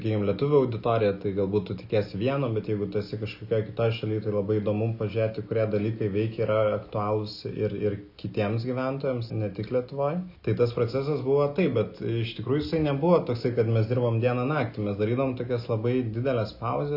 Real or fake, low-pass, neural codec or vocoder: real; 5.4 kHz; none